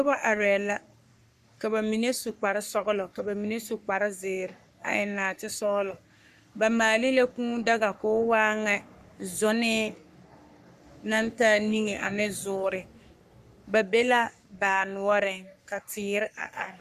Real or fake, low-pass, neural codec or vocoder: fake; 14.4 kHz; codec, 44.1 kHz, 3.4 kbps, Pupu-Codec